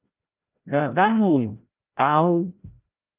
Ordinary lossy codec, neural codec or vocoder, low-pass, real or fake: Opus, 24 kbps; codec, 16 kHz, 0.5 kbps, FreqCodec, larger model; 3.6 kHz; fake